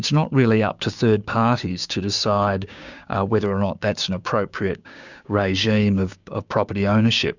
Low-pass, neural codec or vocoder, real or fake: 7.2 kHz; codec, 16 kHz, 6 kbps, DAC; fake